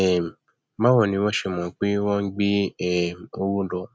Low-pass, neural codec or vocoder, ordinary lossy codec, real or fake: none; none; none; real